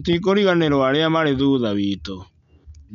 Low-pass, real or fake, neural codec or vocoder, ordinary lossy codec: 7.2 kHz; real; none; none